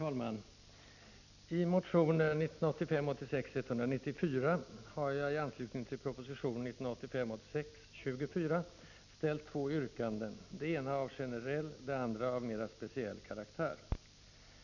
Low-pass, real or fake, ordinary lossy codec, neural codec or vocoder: 7.2 kHz; real; none; none